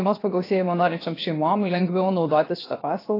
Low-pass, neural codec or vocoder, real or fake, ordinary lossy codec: 5.4 kHz; codec, 16 kHz, 0.7 kbps, FocalCodec; fake; AAC, 24 kbps